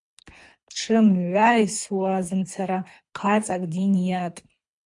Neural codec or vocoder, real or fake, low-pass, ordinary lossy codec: codec, 24 kHz, 3 kbps, HILCodec; fake; 10.8 kHz; MP3, 64 kbps